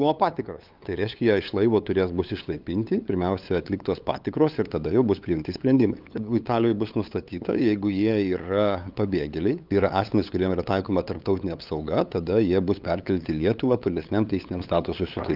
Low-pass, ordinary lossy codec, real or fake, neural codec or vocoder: 5.4 kHz; Opus, 24 kbps; fake; codec, 16 kHz, 8 kbps, FunCodec, trained on LibriTTS, 25 frames a second